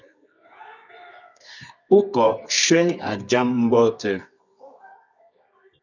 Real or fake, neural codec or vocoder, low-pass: fake; codec, 24 kHz, 0.9 kbps, WavTokenizer, medium music audio release; 7.2 kHz